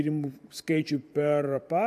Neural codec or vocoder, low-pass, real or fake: vocoder, 44.1 kHz, 128 mel bands every 512 samples, BigVGAN v2; 14.4 kHz; fake